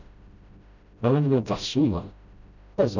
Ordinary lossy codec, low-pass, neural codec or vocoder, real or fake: none; 7.2 kHz; codec, 16 kHz, 0.5 kbps, FreqCodec, smaller model; fake